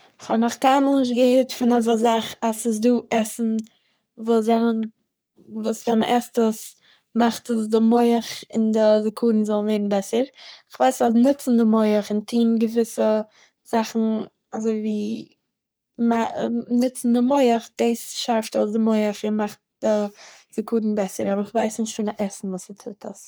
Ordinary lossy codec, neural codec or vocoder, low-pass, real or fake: none; codec, 44.1 kHz, 3.4 kbps, Pupu-Codec; none; fake